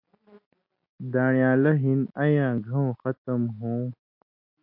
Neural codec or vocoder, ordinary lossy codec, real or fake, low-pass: none; MP3, 32 kbps; real; 5.4 kHz